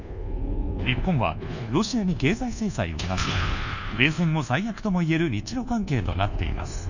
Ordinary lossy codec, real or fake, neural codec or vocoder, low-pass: none; fake; codec, 24 kHz, 1.2 kbps, DualCodec; 7.2 kHz